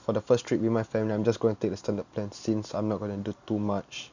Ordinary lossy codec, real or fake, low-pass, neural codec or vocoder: none; real; 7.2 kHz; none